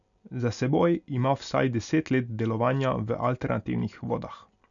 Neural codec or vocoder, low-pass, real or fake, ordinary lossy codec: none; 7.2 kHz; real; none